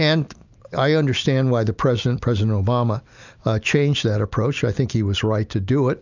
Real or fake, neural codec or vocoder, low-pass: real; none; 7.2 kHz